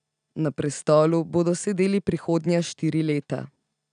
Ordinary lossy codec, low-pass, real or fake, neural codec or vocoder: none; 9.9 kHz; real; none